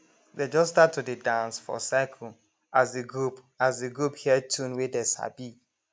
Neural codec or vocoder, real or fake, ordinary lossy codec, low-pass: none; real; none; none